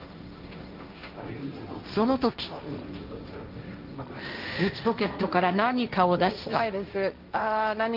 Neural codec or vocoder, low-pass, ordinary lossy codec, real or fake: codec, 16 kHz, 1.1 kbps, Voila-Tokenizer; 5.4 kHz; Opus, 32 kbps; fake